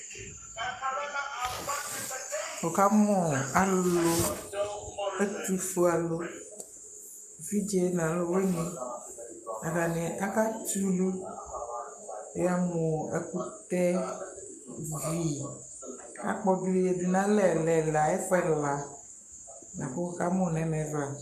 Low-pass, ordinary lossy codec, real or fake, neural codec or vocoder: 14.4 kHz; MP3, 96 kbps; fake; codec, 44.1 kHz, 7.8 kbps, Pupu-Codec